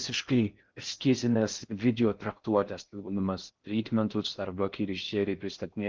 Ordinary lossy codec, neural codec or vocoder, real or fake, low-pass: Opus, 24 kbps; codec, 16 kHz in and 24 kHz out, 0.6 kbps, FocalCodec, streaming, 4096 codes; fake; 7.2 kHz